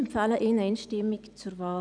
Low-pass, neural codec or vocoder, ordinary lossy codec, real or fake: 9.9 kHz; none; none; real